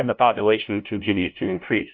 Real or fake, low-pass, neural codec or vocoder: fake; 7.2 kHz; codec, 16 kHz, 0.5 kbps, FunCodec, trained on LibriTTS, 25 frames a second